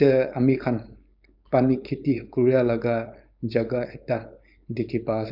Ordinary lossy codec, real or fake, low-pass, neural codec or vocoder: none; fake; 5.4 kHz; codec, 16 kHz, 4.8 kbps, FACodec